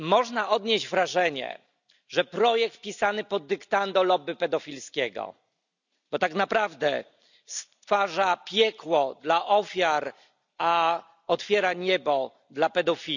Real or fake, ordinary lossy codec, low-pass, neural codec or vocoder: real; none; 7.2 kHz; none